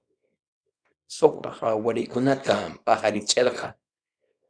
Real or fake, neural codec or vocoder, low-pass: fake; codec, 24 kHz, 0.9 kbps, WavTokenizer, small release; 9.9 kHz